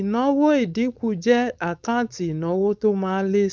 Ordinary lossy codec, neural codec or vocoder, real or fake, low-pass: none; codec, 16 kHz, 4.8 kbps, FACodec; fake; none